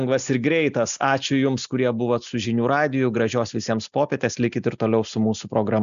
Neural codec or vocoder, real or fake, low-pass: none; real; 7.2 kHz